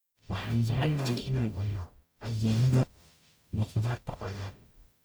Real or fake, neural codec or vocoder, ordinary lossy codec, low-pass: fake; codec, 44.1 kHz, 0.9 kbps, DAC; none; none